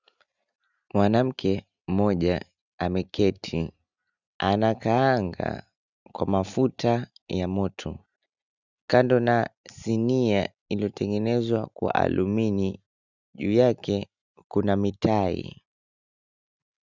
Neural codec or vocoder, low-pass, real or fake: none; 7.2 kHz; real